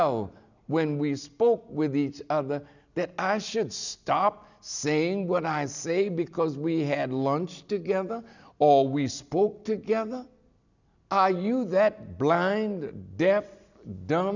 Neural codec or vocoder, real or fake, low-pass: none; real; 7.2 kHz